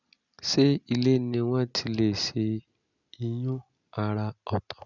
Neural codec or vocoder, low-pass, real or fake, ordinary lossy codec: none; 7.2 kHz; real; none